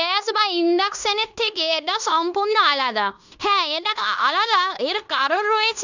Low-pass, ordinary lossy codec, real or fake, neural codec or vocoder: 7.2 kHz; none; fake; codec, 16 kHz in and 24 kHz out, 0.9 kbps, LongCat-Audio-Codec, fine tuned four codebook decoder